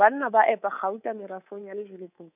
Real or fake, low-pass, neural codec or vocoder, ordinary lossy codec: fake; 3.6 kHz; codec, 24 kHz, 3.1 kbps, DualCodec; none